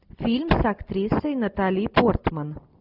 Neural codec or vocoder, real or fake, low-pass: none; real; 5.4 kHz